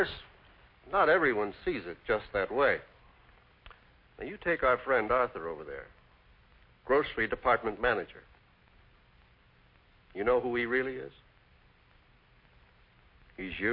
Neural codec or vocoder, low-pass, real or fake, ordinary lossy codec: none; 5.4 kHz; real; MP3, 32 kbps